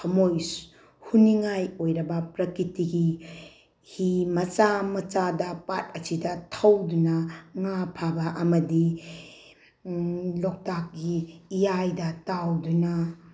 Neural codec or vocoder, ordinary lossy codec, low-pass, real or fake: none; none; none; real